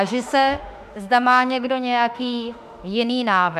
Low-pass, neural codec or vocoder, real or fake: 14.4 kHz; autoencoder, 48 kHz, 32 numbers a frame, DAC-VAE, trained on Japanese speech; fake